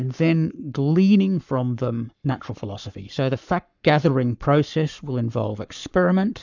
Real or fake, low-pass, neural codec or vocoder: fake; 7.2 kHz; codec, 44.1 kHz, 7.8 kbps, Pupu-Codec